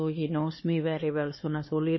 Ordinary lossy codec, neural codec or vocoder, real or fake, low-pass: MP3, 24 kbps; codec, 16 kHz, 2 kbps, X-Codec, HuBERT features, trained on LibriSpeech; fake; 7.2 kHz